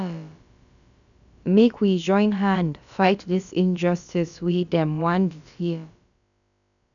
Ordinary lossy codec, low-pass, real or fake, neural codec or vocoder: none; 7.2 kHz; fake; codec, 16 kHz, about 1 kbps, DyCAST, with the encoder's durations